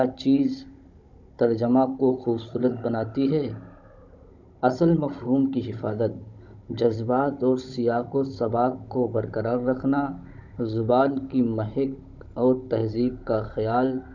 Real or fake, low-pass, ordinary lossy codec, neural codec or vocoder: fake; 7.2 kHz; none; codec, 16 kHz, 16 kbps, FunCodec, trained on Chinese and English, 50 frames a second